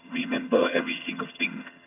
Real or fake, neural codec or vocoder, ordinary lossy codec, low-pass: fake; vocoder, 22.05 kHz, 80 mel bands, HiFi-GAN; none; 3.6 kHz